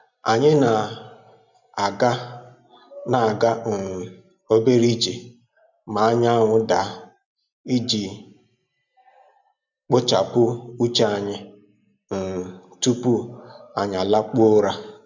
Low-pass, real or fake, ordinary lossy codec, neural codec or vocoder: 7.2 kHz; real; none; none